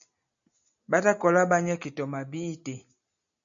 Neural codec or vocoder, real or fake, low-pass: none; real; 7.2 kHz